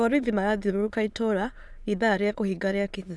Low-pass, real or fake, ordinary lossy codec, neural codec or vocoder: none; fake; none; autoencoder, 22.05 kHz, a latent of 192 numbers a frame, VITS, trained on many speakers